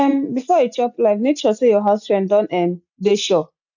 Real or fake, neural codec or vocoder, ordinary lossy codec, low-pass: real; none; none; 7.2 kHz